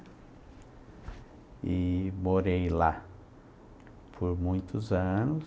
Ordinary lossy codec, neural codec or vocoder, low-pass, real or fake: none; none; none; real